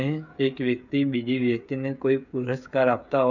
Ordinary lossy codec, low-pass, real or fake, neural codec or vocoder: none; 7.2 kHz; fake; codec, 16 kHz, 8 kbps, FreqCodec, smaller model